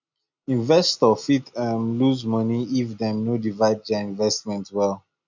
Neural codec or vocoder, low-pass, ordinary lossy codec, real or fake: none; 7.2 kHz; none; real